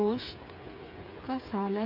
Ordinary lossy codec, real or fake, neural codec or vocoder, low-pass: none; fake; codec, 16 kHz, 8 kbps, FreqCodec, smaller model; 5.4 kHz